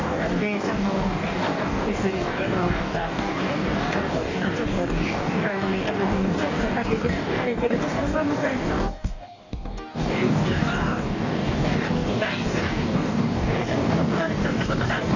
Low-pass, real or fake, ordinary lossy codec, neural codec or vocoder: 7.2 kHz; fake; none; codec, 44.1 kHz, 2.6 kbps, DAC